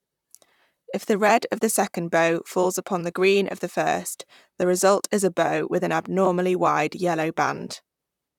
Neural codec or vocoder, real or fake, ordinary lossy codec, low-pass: vocoder, 44.1 kHz, 128 mel bands, Pupu-Vocoder; fake; none; 19.8 kHz